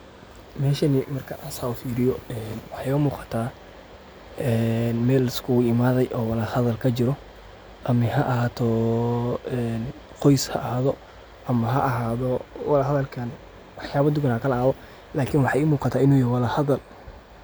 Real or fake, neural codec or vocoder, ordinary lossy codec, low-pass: real; none; none; none